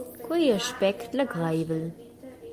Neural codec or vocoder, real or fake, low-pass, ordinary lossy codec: none; real; 14.4 kHz; Opus, 32 kbps